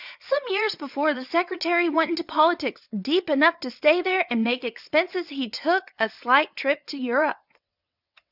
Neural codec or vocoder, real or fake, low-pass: vocoder, 22.05 kHz, 80 mel bands, WaveNeXt; fake; 5.4 kHz